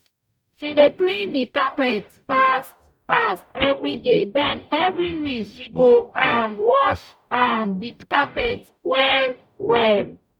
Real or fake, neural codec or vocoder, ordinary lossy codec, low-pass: fake; codec, 44.1 kHz, 0.9 kbps, DAC; none; 19.8 kHz